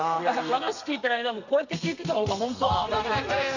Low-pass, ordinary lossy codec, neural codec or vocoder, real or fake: 7.2 kHz; none; codec, 32 kHz, 1.9 kbps, SNAC; fake